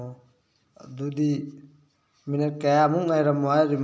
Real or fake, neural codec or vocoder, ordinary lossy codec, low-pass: real; none; none; none